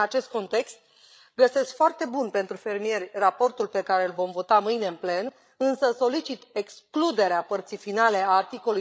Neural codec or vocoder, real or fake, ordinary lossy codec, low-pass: codec, 16 kHz, 8 kbps, FreqCodec, larger model; fake; none; none